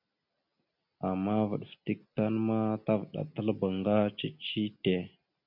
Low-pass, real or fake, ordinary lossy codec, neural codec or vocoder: 5.4 kHz; real; MP3, 48 kbps; none